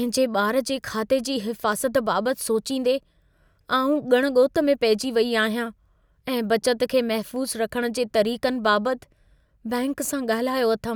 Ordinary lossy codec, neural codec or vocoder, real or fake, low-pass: none; none; real; none